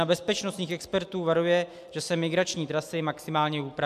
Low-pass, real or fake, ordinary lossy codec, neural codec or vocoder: 14.4 kHz; real; MP3, 96 kbps; none